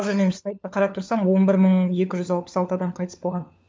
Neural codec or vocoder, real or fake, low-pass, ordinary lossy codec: codec, 16 kHz, 4 kbps, FunCodec, trained on LibriTTS, 50 frames a second; fake; none; none